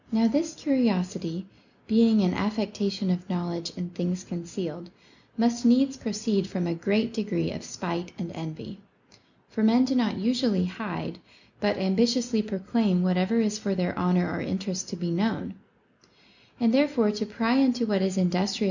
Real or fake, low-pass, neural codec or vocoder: real; 7.2 kHz; none